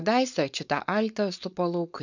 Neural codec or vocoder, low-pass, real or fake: codec, 16 kHz, 4.8 kbps, FACodec; 7.2 kHz; fake